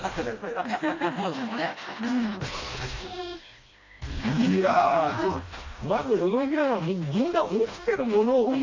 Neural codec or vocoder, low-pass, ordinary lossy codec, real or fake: codec, 16 kHz, 1 kbps, FreqCodec, smaller model; 7.2 kHz; AAC, 32 kbps; fake